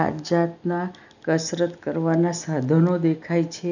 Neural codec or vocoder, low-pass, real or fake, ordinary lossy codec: none; 7.2 kHz; real; none